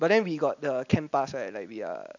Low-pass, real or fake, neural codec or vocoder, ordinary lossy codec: 7.2 kHz; real; none; none